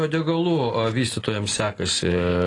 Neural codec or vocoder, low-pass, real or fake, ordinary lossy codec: none; 10.8 kHz; real; AAC, 32 kbps